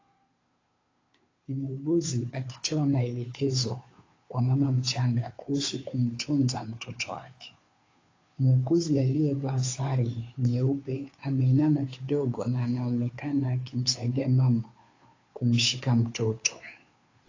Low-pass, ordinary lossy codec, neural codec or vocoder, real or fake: 7.2 kHz; AAC, 32 kbps; codec, 16 kHz, 2 kbps, FunCodec, trained on Chinese and English, 25 frames a second; fake